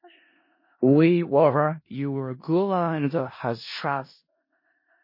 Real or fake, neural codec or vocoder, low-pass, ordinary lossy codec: fake; codec, 16 kHz in and 24 kHz out, 0.4 kbps, LongCat-Audio-Codec, four codebook decoder; 5.4 kHz; MP3, 24 kbps